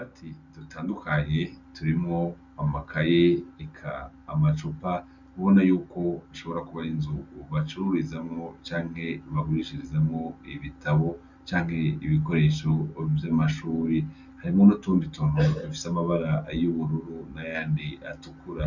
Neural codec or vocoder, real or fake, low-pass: none; real; 7.2 kHz